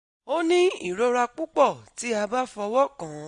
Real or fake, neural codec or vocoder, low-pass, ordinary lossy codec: real; none; 9.9 kHz; MP3, 48 kbps